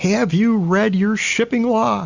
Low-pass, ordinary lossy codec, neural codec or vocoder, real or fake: 7.2 kHz; Opus, 64 kbps; none; real